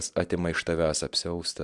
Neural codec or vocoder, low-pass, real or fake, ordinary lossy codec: none; 10.8 kHz; real; MP3, 96 kbps